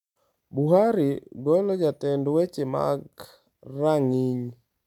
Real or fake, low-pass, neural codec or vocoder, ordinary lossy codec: real; 19.8 kHz; none; none